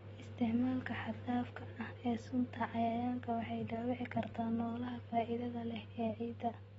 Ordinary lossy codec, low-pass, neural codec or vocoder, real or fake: AAC, 24 kbps; 14.4 kHz; none; real